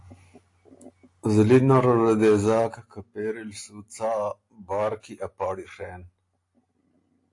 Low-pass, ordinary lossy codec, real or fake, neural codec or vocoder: 10.8 kHz; AAC, 48 kbps; real; none